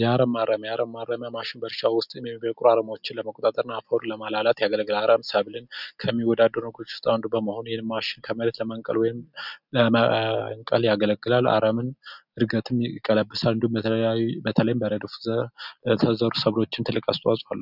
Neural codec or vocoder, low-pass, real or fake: none; 5.4 kHz; real